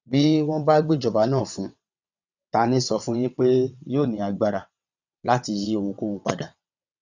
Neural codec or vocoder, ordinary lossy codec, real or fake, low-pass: vocoder, 22.05 kHz, 80 mel bands, WaveNeXt; none; fake; 7.2 kHz